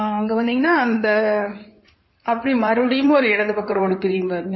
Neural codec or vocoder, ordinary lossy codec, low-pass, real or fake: codec, 16 kHz in and 24 kHz out, 2.2 kbps, FireRedTTS-2 codec; MP3, 24 kbps; 7.2 kHz; fake